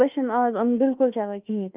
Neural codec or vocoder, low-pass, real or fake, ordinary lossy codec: autoencoder, 48 kHz, 32 numbers a frame, DAC-VAE, trained on Japanese speech; 3.6 kHz; fake; Opus, 24 kbps